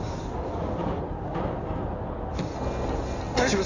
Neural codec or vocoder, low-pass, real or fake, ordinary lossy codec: codec, 16 kHz in and 24 kHz out, 2.2 kbps, FireRedTTS-2 codec; 7.2 kHz; fake; none